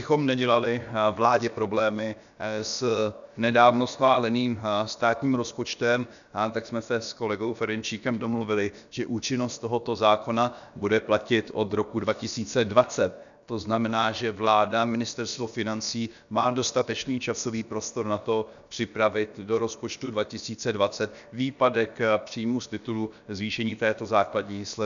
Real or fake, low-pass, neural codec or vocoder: fake; 7.2 kHz; codec, 16 kHz, about 1 kbps, DyCAST, with the encoder's durations